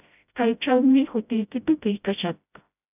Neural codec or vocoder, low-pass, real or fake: codec, 16 kHz, 0.5 kbps, FreqCodec, smaller model; 3.6 kHz; fake